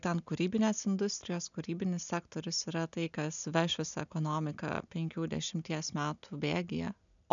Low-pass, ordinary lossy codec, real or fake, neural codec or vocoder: 7.2 kHz; MP3, 96 kbps; real; none